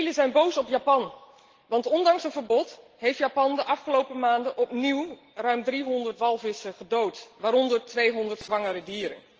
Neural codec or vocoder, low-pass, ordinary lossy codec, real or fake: none; 7.2 kHz; Opus, 16 kbps; real